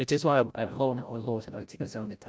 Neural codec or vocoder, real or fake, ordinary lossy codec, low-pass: codec, 16 kHz, 0.5 kbps, FreqCodec, larger model; fake; none; none